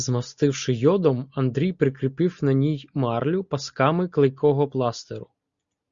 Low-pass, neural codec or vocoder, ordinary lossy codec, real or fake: 7.2 kHz; none; Opus, 64 kbps; real